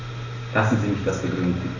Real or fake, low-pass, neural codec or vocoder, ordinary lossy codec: real; 7.2 kHz; none; AAC, 32 kbps